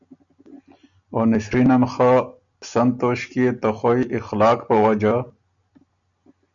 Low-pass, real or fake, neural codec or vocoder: 7.2 kHz; real; none